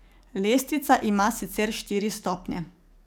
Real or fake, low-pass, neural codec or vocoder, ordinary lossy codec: fake; none; codec, 44.1 kHz, 7.8 kbps, DAC; none